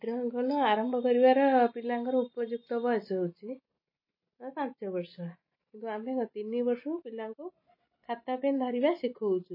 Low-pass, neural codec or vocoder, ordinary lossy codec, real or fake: 5.4 kHz; none; MP3, 24 kbps; real